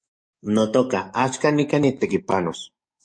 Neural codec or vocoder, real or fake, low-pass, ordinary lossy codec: codec, 44.1 kHz, 7.8 kbps, DAC; fake; 9.9 kHz; MP3, 48 kbps